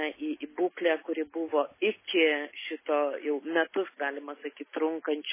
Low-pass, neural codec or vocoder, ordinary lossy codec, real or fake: 3.6 kHz; none; MP3, 16 kbps; real